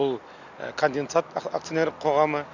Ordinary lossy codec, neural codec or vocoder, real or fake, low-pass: none; none; real; 7.2 kHz